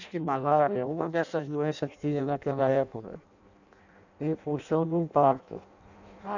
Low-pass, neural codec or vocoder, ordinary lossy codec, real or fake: 7.2 kHz; codec, 16 kHz in and 24 kHz out, 0.6 kbps, FireRedTTS-2 codec; none; fake